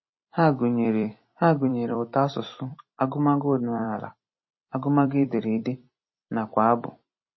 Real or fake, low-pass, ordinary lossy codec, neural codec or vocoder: fake; 7.2 kHz; MP3, 24 kbps; vocoder, 44.1 kHz, 128 mel bands every 256 samples, BigVGAN v2